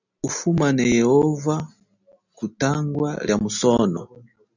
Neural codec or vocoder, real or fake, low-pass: none; real; 7.2 kHz